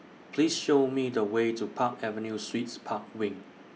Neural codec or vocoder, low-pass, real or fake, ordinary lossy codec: none; none; real; none